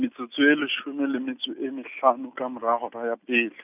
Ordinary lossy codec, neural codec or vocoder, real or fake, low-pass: none; none; real; 3.6 kHz